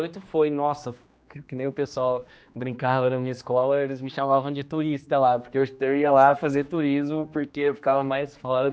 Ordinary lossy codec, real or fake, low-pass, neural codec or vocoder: none; fake; none; codec, 16 kHz, 2 kbps, X-Codec, HuBERT features, trained on general audio